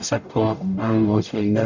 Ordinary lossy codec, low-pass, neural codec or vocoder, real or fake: none; 7.2 kHz; codec, 44.1 kHz, 0.9 kbps, DAC; fake